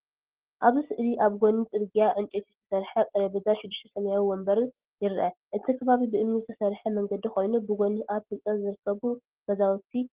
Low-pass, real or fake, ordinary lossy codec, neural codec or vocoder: 3.6 kHz; real; Opus, 16 kbps; none